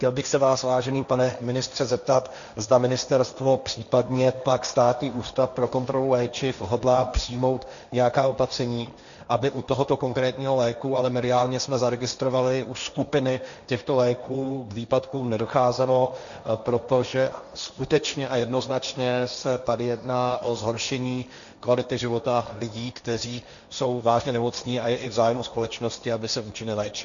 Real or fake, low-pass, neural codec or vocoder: fake; 7.2 kHz; codec, 16 kHz, 1.1 kbps, Voila-Tokenizer